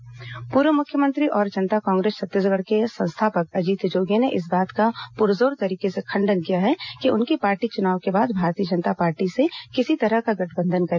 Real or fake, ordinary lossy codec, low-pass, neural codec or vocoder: real; MP3, 64 kbps; 7.2 kHz; none